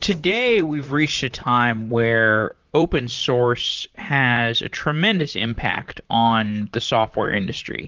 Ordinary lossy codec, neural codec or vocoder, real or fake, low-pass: Opus, 32 kbps; vocoder, 44.1 kHz, 128 mel bands, Pupu-Vocoder; fake; 7.2 kHz